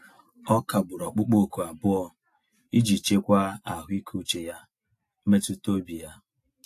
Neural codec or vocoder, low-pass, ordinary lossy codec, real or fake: none; 14.4 kHz; AAC, 64 kbps; real